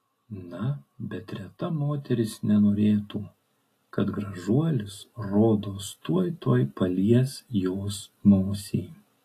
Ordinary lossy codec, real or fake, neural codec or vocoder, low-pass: AAC, 48 kbps; real; none; 14.4 kHz